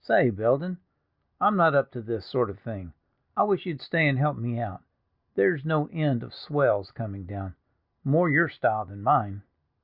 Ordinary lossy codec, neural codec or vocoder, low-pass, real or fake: Opus, 64 kbps; none; 5.4 kHz; real